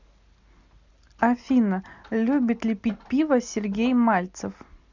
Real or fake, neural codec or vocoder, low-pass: real; none; 7.2 kHz